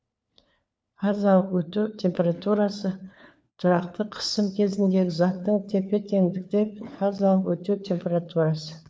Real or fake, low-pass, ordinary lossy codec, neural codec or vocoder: fake; none; none; codec, 16 kHz, 4 kbps, FunCodec, trained on LibriTTS, 50 frames a second